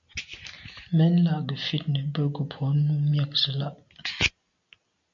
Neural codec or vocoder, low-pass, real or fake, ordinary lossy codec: none; 7.2 kHz; real; MP3, 48 kbps